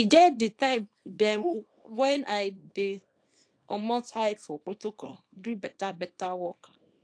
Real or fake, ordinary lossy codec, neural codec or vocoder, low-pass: fake; AAC, 48 kbps; codec, 24 kHz, 0.9 kbps, WavTokenizer, small release; 9.9 kHz